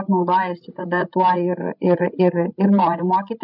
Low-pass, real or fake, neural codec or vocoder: 5.4 kHz; fake; codec, 16 kHz, 16 kbps, FreqCodec, larger model